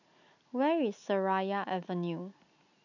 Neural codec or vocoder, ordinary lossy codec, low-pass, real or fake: none; none; 7.2 kHz; real